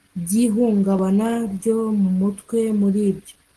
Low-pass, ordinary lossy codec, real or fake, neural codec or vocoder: 10.8 kHz; Opus, 16 kbps; real; none